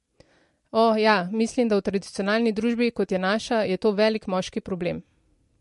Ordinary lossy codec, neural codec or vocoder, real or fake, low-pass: MP3, 48 kbps; vocoder, 44.1 kHz, 128 mel bands every 256 samples, BigVGAN v2; fake; 14.4 kHz